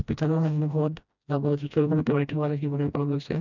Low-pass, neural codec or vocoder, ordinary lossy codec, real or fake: 7.2 kHz; codec, 16 kHz, 1 kbps, FreqCodec, smaller model; none; fake